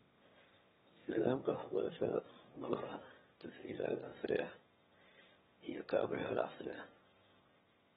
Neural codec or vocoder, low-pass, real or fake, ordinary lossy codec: autoencoder, 22.05 kHz, a latent of 192 numbers a frame, VITS, trained on one speaker; 9.9 kHz; fake; AAC, 16 kbps